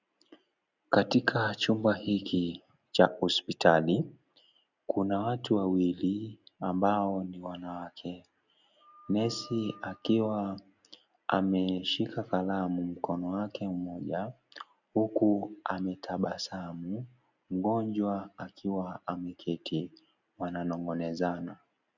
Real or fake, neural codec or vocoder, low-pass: real; none; 7.2 kHz